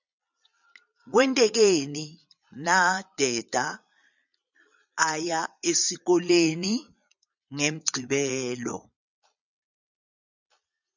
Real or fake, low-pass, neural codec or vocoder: fake; 7.2 kHz; vocoder, 22.05 kHz, 80 mel bands, Vocos